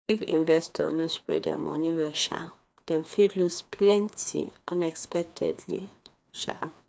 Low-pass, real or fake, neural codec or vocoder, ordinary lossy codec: none; fake; codec, 16 kHz, 2 kbps, FreqCodec, larger model; none